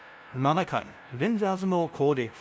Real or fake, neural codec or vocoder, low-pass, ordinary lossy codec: fake; codec, 16 kHz, 0.5 kbps, FunCodec, trained on LibriTTS, 25 frames a second; none; none